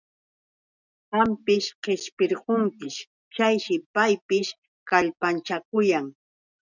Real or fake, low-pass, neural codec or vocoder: real; 7.2 kHz; none